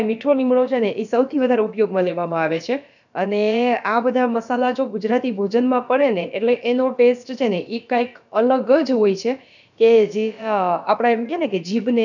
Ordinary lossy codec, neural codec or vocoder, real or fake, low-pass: none; codec, 16 kHz, about 1 kbps, DyCAST, with the encoder's durations; fake; 7.2 kHz